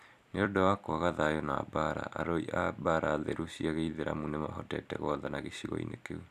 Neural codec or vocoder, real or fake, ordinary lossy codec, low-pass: vocoder, 44.1 kHz, 128 mel bands every 256 samples, BigVGAN v2; fake; none; 14.4 kHz